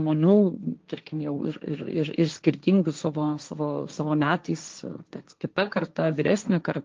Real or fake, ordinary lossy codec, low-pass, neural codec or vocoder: fake; Opus, 24 kbps; 7.2 kHz; codec, 16 kHz, 1.1 kbps, Voila-Tokenizer